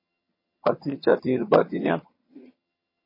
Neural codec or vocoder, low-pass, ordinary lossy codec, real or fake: vocoder, 22.05 kHz, 80 mel bands, HiFi-GAN; 5.4 kHz; MP3, 24 kbps; fake